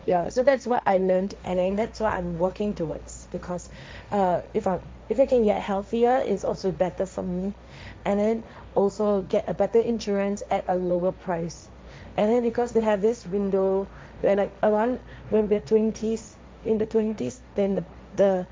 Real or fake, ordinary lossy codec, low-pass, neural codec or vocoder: fake; none; none; codec, 16 kHz, 1.1 kbps, Voila-Tokenizer